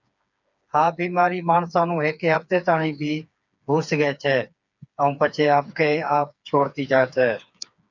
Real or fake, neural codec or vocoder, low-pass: fake; codec, 16 kHz, 4 kbps, FreqCodec, smaller model; 7.2 kHz